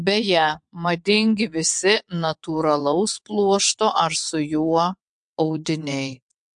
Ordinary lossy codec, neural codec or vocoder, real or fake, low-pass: MP3, 64 kbps; vocoder, 22.05 kHz, 80 mel bands, WaveNeXt; fake; 9.9 kHz